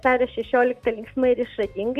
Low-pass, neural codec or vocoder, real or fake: 14.4 kHz; none; real